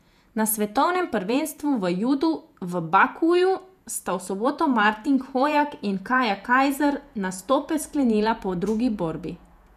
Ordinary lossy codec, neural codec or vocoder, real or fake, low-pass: none; vocoder, 48 kHz, 128 mel bands, Vocos; fake; 14.4 kHz